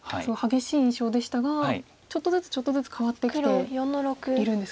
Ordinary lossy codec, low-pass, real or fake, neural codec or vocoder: none; none; real; none